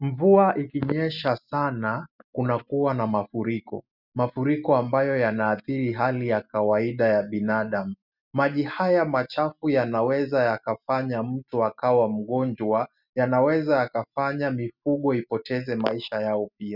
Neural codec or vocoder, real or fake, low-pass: none; real; 5.4 kHz